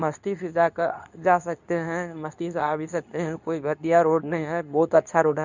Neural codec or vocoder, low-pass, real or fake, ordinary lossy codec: codec, 24 kHz, 0.9 kbps, WavTokenizer, medium speech release version 2; 7.2 kHz; fake; none